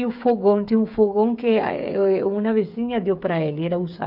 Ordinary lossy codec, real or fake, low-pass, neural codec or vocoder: none; fake; 5.4 kHz; codec, 16 kHz, 8 kbps, FreqCodec, smaller model